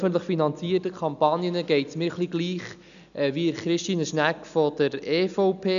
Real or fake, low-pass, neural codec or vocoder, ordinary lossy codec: real; 7.2 kHz; none; none